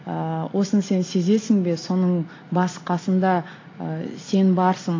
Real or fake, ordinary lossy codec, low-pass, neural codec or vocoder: real; AAC, 32 kbps; 7.2 kHz; none